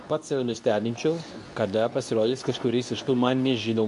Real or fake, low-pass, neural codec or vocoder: fake; 10.8 kHz; codec, 24 kHz, 0.9 kbps, WavTokenizer, medium speech release version 2